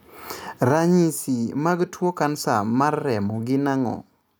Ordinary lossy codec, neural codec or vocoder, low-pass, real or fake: none; none; none; real